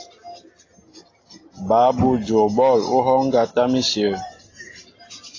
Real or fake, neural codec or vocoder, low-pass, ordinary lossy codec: real; none; 7.2 kHz; AAC, 32 kbps